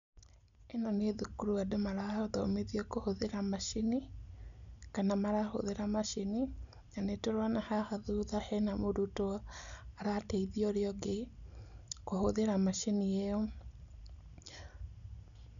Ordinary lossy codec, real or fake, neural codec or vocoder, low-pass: none; real; none; 7.2 kHz